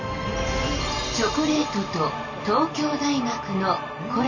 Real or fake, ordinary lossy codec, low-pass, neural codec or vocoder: real; none; 7.2 kHz; none